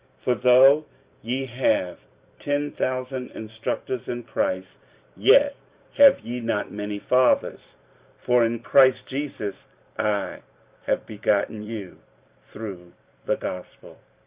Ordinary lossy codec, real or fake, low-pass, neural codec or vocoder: Opus, 64 kbps; fake; 3.6 kHz; vocoder, 44.1 kHz, 128 mel bands every 512 samples, BigVGAN v2